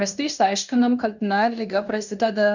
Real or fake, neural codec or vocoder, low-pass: fake; codec, 16 kHz in and 24 kHz out, 0.9 kbps, LongCat-Audio-Codec, fine tuned four codebook decoder; 7.2 kHz